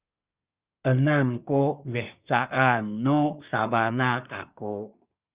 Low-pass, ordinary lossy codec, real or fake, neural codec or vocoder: 3.6 kHz; Opus, 64 kbps; fake; codec, 24 kHz, 1 kbps, SNAC